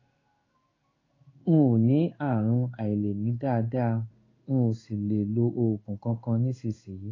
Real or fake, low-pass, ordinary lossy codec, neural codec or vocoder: fake; 7.2 kHz; AAC, 32 kbps; codec, 16 kHz in and 24 kHz out, 1 kbps, XY-Tokenizer